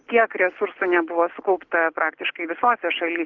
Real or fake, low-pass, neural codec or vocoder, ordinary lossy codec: real; 7.2 kHz; none; Opus, 16 kbps